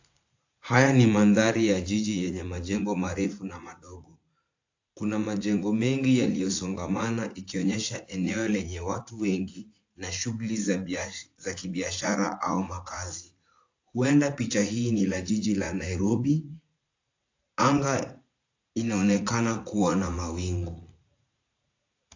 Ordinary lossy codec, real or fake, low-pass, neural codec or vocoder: AAC, 48 kbps; fake; 7.2 kHz; vocoder, 44.1 kHz, 80 mel bands, Vocos